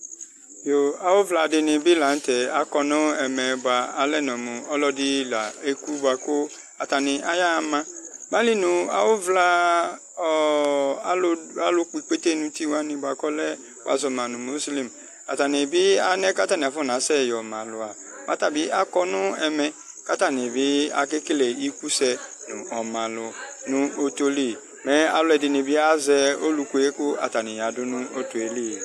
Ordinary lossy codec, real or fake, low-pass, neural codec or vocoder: AAC, 64 kbps; real; 14.4 kHz; none